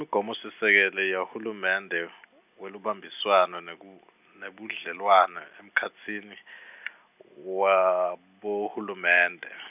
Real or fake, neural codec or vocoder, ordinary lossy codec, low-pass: real; none; none; 3.6 kHz